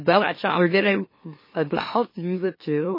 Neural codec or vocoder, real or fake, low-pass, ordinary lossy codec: autoencoder, 44.1 kHz, a latent of 192 numbers a frame, MeloTTS; fake; 5.4 kHz; MP3, 24 kbps